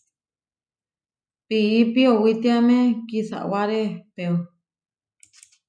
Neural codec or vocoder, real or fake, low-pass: none; real; 9.9 kHz